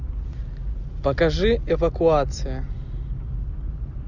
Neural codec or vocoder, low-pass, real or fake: none; 7.2 kHz; real